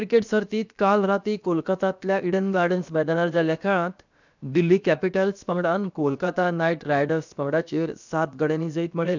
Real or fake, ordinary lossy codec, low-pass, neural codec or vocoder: fake; none; 7.2 kHz; codec, 16 kHz, about 1 kbps, DyCAST, with the encoder's durations